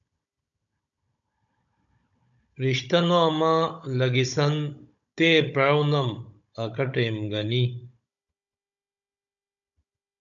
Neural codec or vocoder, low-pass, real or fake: codec, 16 kHz, 16 kbps, FunCodec, trained on Chinese and English, 50 frames a second; 7.2 kHz; fake